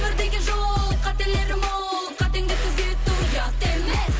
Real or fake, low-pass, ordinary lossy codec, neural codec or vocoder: real; none; none; none